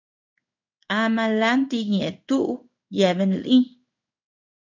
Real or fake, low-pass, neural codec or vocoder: fake; 7.2 kHz; codec, 16 kHz in and 24 kHz out, 1 kbps, XY-Tokenizer